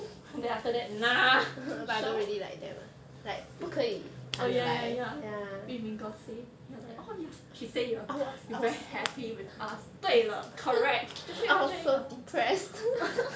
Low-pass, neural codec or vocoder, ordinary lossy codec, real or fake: none; none; none; real